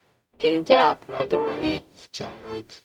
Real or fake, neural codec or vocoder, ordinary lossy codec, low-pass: fake; codec, 44.1 kHz, 0.9 kbps, DAC; none; 19.8 kHz